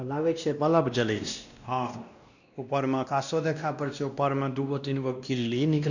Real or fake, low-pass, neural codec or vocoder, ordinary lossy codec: fake; 7.2 kHz; codec, 16 kHz, 1 kbps, X-Codec, WavLM features, trained on Multilingual LibriSpeech; none